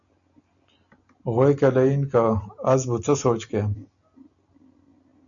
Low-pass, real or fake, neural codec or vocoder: 7.2 kHz; real; none